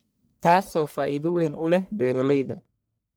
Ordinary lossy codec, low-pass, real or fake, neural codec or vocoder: none; none; fake; codec, 44.1 kHz, 1.7 kbps, Pupu-Codec